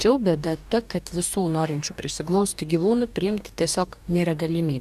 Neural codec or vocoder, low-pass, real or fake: codec, 44.1 kHz, 2.6 kbps, DAC; 14.4 kHz; fake